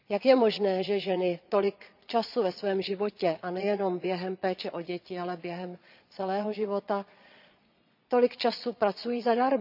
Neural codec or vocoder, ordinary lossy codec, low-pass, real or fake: vocoder, 22.05 kHz, 80 mel bands, Vocos; none; 5.4 kHz; fake